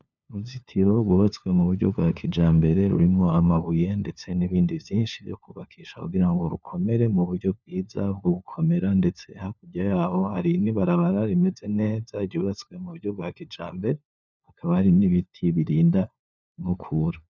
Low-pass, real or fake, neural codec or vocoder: 7.2 kHz; fake; codec, 16 kHz, 4 kbps, FunCodec, trained on LibriTTS, 50 frames a second